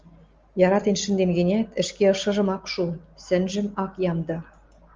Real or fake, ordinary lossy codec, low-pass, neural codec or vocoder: real; Opus, 32 kbps; 7.2 kHz; none